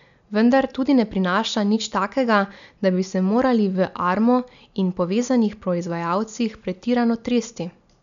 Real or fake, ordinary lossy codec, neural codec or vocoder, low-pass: real; none; none; 7.2 kHz